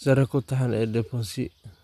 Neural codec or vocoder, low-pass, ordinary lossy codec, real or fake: none; 14.4 kHz; none; real